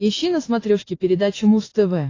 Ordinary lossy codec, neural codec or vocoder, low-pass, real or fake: AAC, 32 kbps; none; 7.2 kHz; real